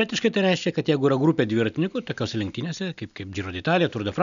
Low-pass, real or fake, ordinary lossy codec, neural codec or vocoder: 7.2 kHz; real; MP3, 96 kbps; none